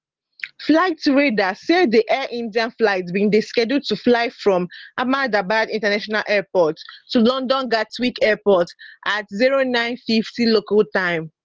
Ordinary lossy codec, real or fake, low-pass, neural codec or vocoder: Opus, 16 kbps; real; 7.2 kHz; none